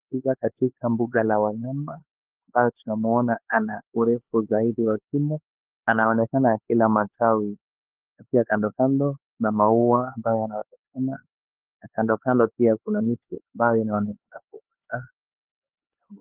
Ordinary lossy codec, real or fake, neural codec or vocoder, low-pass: Opus, 16 kbps; fake; codec, 16 kHz, 4 kbps, X-Codec, HuBERT features, trained on LibriSpeech; 3.6 kHz